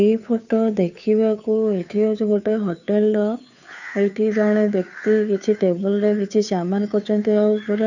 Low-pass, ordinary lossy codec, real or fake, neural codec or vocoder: 7.2 kHz; none; fake; codec, 16 kHz, 2 kbps, FunCodec, trained on Chinese and English, 25 frames a second